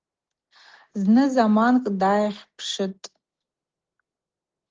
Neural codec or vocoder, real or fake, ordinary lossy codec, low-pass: none; real; Opus, 16 kbps; 7.2 kHz